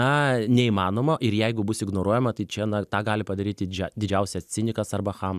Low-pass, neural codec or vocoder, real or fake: 14.4 kHz; none; real